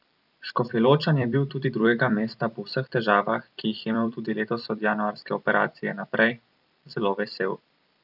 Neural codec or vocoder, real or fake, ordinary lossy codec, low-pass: vocoder, 44.1 kHz, 128 mel bands every 256 samples, BigVGAN v2; fake; none; 5.4 kHz